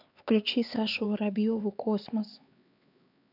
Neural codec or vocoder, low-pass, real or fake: codec, 16 kHz, 4 kbps, X-Codec, HuBERT features, trained on LibriSpeech; 5.4 kHz; fake